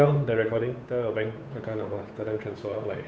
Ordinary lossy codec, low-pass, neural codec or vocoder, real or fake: none; none; codec, 16 kHz, 8 kbps, FunCodec, trained on Chinese and English, 25 frames a second; fake